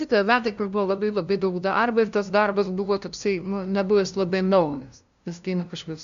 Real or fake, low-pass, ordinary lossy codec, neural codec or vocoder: fake; 7.2 kHz; MP3, 64 kbps; codec, 16 kHz, 0.5 kbps, FunCodec, trained on LibriTTS, 25 frames a second